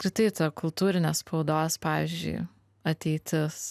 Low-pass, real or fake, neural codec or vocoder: 14.4 kHz; real; none